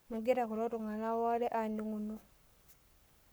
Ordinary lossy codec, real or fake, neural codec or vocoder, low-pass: none; fake; codec, 44.1 kHz, 7.8 kbps, Pupu-Codec; none